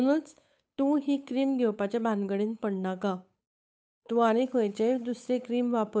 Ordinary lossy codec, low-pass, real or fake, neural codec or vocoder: none; none; fake; codec, 16 kHz, 8 kbps, FunCodec, trained on Chinese and English, 25 frames a second